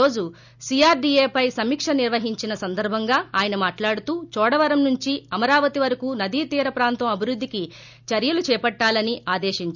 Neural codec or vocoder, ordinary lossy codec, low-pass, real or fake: none; none; 7.2 kHz; real